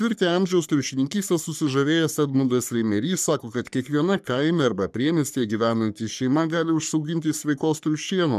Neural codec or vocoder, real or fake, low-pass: codec, 44.1 kHz, 3.4 kbps, Pupu-Codec; fake; 14.4 kHz